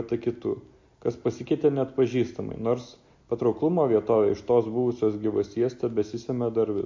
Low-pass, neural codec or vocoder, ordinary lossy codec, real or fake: 7.2 kHz; none; MP3, 48 kbps; real